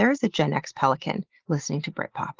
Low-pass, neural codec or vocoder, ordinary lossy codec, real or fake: 7.2 kHz; none; Opus, 16 kbps; real